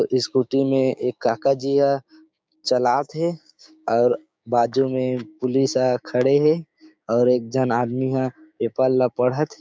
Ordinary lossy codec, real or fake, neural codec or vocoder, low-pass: none; fake; codec, 16 kHz, 6 kbps, DAC; none